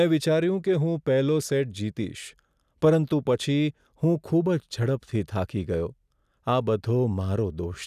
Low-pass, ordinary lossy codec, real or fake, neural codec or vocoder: 14.4 kHz; none; real; none